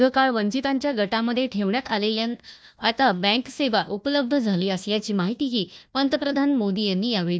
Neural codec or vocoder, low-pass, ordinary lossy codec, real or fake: codec, 16 kHz, 1 kbps, FunCodec, trained on Chinese and English, 50 frames a second; none; none; fake